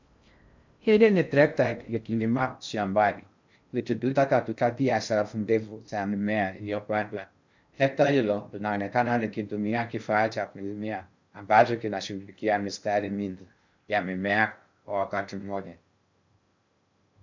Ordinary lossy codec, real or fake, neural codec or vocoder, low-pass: MP3, 64 kbps; fake; codec, 16 kHz in and 24 kHz out, 0.6 kbps, FocalCodec, streaming, 2048 codes; 7.2 kHz